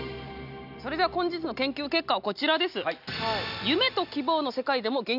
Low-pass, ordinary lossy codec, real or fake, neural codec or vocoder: 5.4 kHz; none; real; none